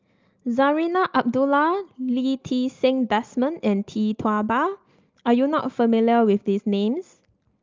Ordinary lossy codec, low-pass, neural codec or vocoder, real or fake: Opus, 32 kbps; 7.2 kHz; none; real